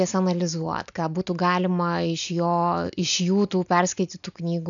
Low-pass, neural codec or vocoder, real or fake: 7.2 kHz; none; real